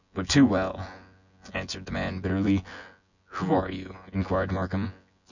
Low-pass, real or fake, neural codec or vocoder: 7.2 kHz; fake; vocoder, 24 kHz, 100 mel bands, Vocos